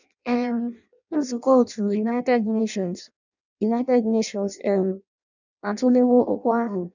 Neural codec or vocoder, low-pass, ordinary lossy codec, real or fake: codec, 16 kHz in and 24 kHz out, 0.6 kbps, FireRedTTS-2 codec; 7.2 kHz; none; fake